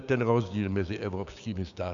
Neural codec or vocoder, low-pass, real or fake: codec, 16 kHz, 6 kbps, DAC; 7.2 kHz; fake